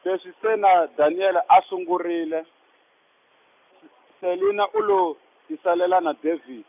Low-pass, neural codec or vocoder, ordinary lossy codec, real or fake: 3.6 kHz; none; none; real